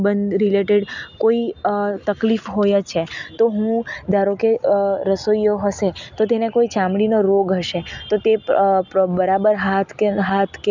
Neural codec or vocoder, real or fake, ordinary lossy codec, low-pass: none; real; none; 7.2 kHz